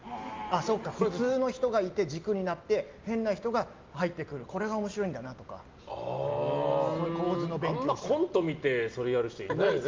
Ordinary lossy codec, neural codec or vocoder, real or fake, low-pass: Opus, 32 kbps; none; real; 7.2 kHz